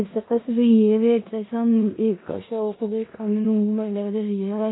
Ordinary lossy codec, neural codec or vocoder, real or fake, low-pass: AAC, 16 kbps; codec, 16 kHz in and 24 kHz out, 0.4 kbps, LongCat-Audio-Codec, four codebook decoder; fake; 7.2 kHz